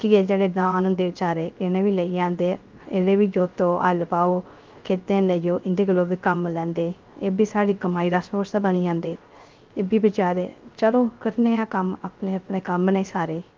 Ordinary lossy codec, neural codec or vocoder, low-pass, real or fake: Opus, 32 kbps; codec, 16 kHz, 0.3 kbps, FocalCodec; 7.2 kHz; fake